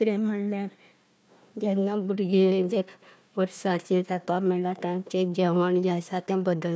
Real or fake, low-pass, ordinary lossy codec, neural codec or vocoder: fake; none; none; codec, 16 kHz, 1 kbps, FunCodec, trained on Chinese and English, 50 frames a second